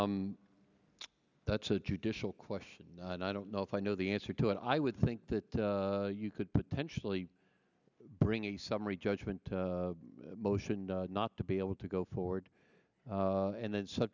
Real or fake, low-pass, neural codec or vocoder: real; 7.2 kHz; none